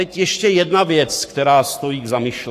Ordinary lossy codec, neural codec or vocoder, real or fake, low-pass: AAC, 64 kbps; codec, 44.1 kHz, 7.8 kbps, Pupu-Codec; fake; 14.4 kHz